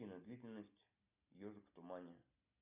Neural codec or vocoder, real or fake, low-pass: none; real; 3.6 kHz